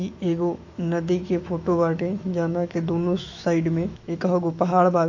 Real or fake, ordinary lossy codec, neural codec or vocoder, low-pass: fake; AAC, 48 kbps; autoencoder, 48 kHz, 128 numbers a frame, DAC-VAE, trained on Japanese speech; 7.2 kHz